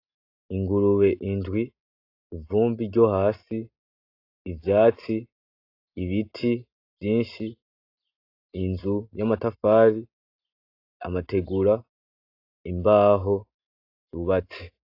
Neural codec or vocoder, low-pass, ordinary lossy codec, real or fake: none; 5.4 kHz; AAC, 32 kbps; real